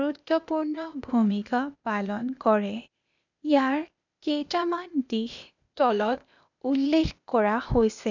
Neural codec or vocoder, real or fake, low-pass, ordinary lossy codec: codec, 16 kHz, 0.8 kbps, ZipCodec; fake; 7.2 kHz; none